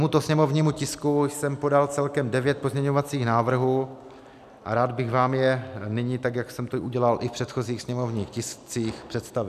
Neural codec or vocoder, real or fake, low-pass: none; real; 14.4 kHz